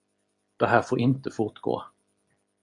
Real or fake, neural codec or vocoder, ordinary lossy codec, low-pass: real; none; AAC, 64 kbps; 10.8 kHz